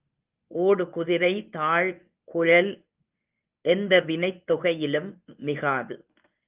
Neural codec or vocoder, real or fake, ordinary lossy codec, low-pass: codec, 24 kHz, 0.9 kbps, WavTokenizer, medium speech release version 1; fake; Opus, 24 kbps; 3.6 kHz